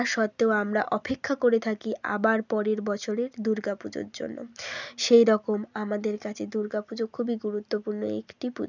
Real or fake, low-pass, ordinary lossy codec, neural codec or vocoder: real; 7.2 kHz; none; none